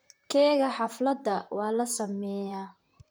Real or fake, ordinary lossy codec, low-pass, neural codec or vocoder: fake; none; none; vocoder, 44.1 kHz, 128 mel bands, Pupu-Vocoder